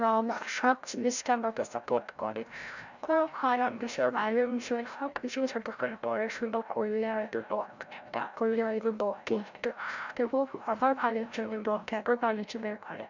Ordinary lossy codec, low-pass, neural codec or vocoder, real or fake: none; 7.2 kHz; codec, 16 kHz, 0.5 kbps, FreqCodec, larger model; fake